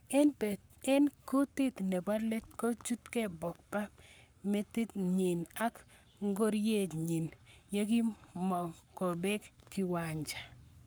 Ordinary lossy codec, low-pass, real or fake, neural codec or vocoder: none; none; fake; codec, 44.1 kHz, 7.8 kbps, Pupu-Codec